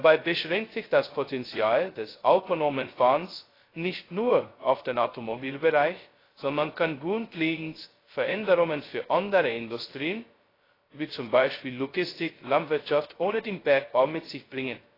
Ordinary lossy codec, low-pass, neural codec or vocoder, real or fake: AAC, 24 kbps; 5.4 kHz; codec, 16 kHz, 0.2 kbps, FocalCodec; fake